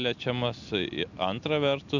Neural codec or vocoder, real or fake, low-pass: none; real; 7.2 kHz